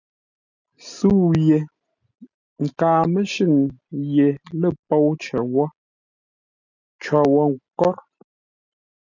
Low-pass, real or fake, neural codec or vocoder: 7.2 kHz; real; none